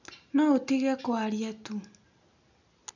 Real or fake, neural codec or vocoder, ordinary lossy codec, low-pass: real; none; none; 7.2 kHz